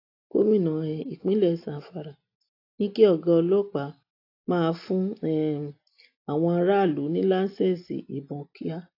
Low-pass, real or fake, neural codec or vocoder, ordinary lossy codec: 5.4 kHz; real; none; AAC, 32 kbps